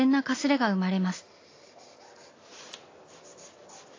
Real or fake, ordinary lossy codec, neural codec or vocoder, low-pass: real; AAC, 32 kbps; none; 7.2 kHz